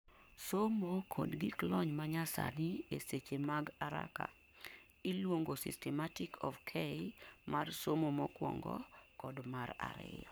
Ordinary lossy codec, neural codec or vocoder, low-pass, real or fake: none; codec, 44.1 kHz, 7.8 kbps, Pupu-Codec; none; fake